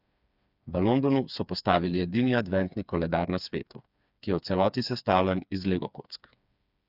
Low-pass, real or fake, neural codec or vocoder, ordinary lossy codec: 5.4 kHz; fake; codec, 16 kHz, 4 kbps, FreqCodec, smaller model; none